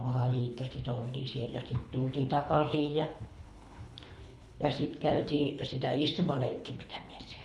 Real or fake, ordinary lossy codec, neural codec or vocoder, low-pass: fake; none; codec, 24 kHz, 3 kbps, HILCodec; none